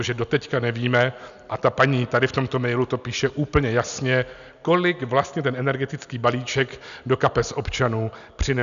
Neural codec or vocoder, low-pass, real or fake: none; 7.2 kHz; real